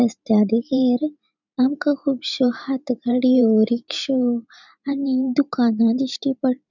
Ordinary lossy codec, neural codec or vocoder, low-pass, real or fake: none; vocoder, 44.1 kHz, 128 mel bands every 512 samples, BigVGAN v2; 7.2 kHz; fake